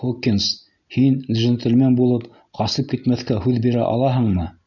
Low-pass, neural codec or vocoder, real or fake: 7.2 kHz; none; real